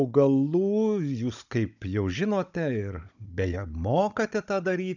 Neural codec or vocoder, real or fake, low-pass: codec, 16 kHz, 16 kbps, FunCodec, trained on Chinese and English, 50 frames a second; fake; 7.2 kHz